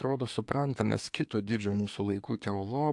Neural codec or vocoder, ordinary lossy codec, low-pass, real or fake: codec, 24 kHz, 1 kbps, SNAC; AAC, 64 kbps; 10.8 kHz; fake